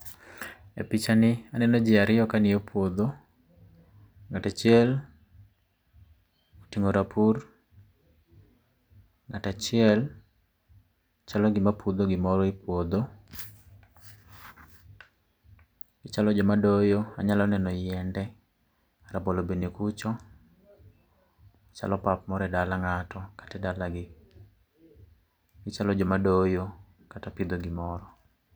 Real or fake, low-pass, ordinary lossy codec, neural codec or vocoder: real; none; none; none